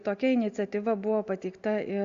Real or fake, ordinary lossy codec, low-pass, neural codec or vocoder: real; Opus, 64 kbps; 7.2 kHz; none